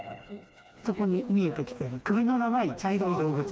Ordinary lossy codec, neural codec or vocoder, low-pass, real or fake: none; codec, 16 kHz, 2 kbps, FreqCodec, smaller model; none; fake